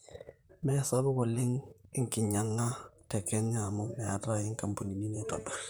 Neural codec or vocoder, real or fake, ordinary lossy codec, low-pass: vocoder, 44.1 kHz, 128 mel bands, Pupu-Vocoder; fake; none; none